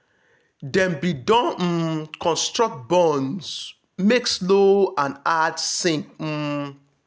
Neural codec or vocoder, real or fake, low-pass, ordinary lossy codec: none; real; none; none